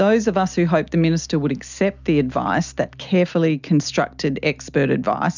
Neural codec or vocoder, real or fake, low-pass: none; real; 7.2 kHz